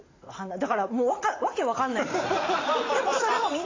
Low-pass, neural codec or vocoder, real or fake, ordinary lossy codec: 7.2 kHz; vocoder, 44.1 kHz, 80 mel bands, Vocos; fake; AAC, 32 kbps